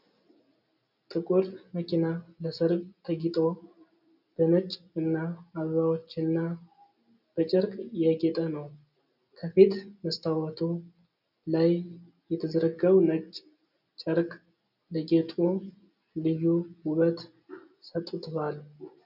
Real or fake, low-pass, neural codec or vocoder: real; 5.4 kHz; none